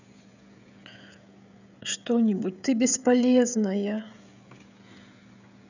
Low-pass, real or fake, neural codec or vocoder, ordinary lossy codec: 7.2 kHz; fake; codec, 16 kHz, 16 kbps, FreqCodec, smaller model; none